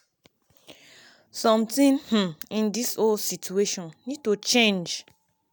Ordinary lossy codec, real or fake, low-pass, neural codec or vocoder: none; real; none; none